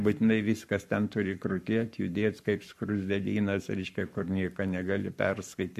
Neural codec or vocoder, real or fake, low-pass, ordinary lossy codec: codec, 44.1 kHz, 7.8 kbps, Pupu-Codec; fake; 14.4 kHz; MP3, 64 kbps